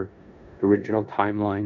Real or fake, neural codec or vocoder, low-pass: fake; codec, 16 kHz in and 24 kHz out, 0.9 kbps, LongCat-Audio-Codec, four codebook decoder; 7.2 kHz